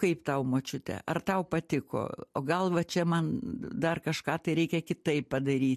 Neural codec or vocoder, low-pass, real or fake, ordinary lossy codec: none; 14.4 kHz; real; MP3, 64 kbps